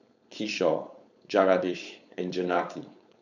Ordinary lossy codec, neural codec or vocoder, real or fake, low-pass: none; codec, 16 kHz, 4.8 kbps, FACodec; fake; 7.2 kHz